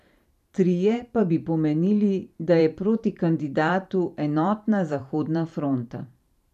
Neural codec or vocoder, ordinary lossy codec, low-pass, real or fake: vocoder, 44.1 kHz, 128 mel bands every 512 samples, BigVGAN v2; none; 14.4 kHz; fake